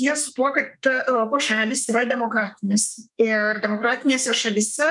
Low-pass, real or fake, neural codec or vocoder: 10.8 kHz; fake; codec, 32 kHz, 1.9 kbps, SNAC